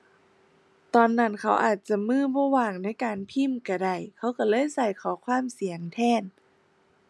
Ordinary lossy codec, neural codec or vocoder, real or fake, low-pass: none; none; real; none